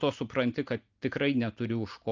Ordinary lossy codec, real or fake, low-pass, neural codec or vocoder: Opus, 24 kbps; real; 7.2 kHz; none